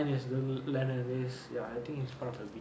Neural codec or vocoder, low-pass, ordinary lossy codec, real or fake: none; none; none; real